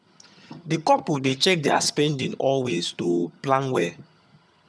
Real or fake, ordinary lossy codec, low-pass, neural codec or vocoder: fake; none; none; vocoder, 22.05 kHz, 80 mel bands, HiFi-GAN